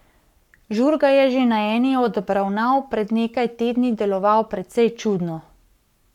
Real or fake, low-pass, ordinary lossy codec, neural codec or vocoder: fake; 19.8 kHz; none; codec, 44.1 kHz, 7.8 kbps, Pupu-Codec